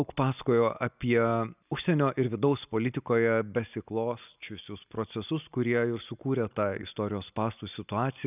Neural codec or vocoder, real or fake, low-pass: none; real; 3.6 kHz